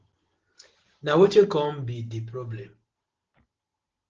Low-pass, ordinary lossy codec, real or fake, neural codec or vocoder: 7.2 kHz; Opus, 16 kbps; real; none